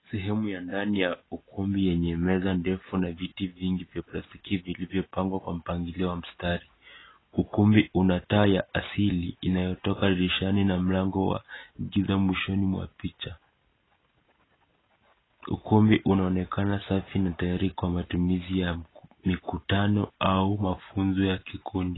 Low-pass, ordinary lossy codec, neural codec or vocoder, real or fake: 7.2 kHz; AAC, 16 kbps; none; real